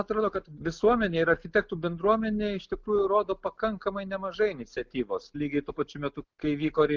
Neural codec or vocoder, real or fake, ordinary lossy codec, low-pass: none; real; Opus, 24 kbps; 7.2 kHz